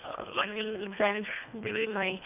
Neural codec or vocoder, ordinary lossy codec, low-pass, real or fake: codec, 24 kHz, 1.5 kbps, HILCodec; none; 3.6 kHz; fake